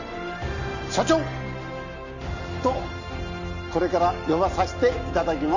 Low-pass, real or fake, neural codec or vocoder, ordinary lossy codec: 7.2 kHz; real; none; none